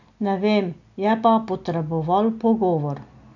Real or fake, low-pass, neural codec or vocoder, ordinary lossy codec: real; 7.2 kHz; none; none